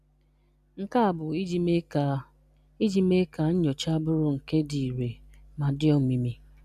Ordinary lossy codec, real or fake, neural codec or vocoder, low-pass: none; real; none; 14.4 kHz